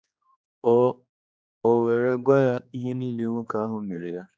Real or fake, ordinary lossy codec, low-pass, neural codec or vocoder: fake; none; none; codec, 16 kHz, 2 kbps, X-Codec, HuBERT features, trained on general audio